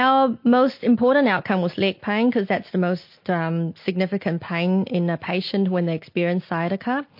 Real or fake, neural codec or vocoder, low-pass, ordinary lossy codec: real; none; 5.4 kHz; MP3, 32 kbps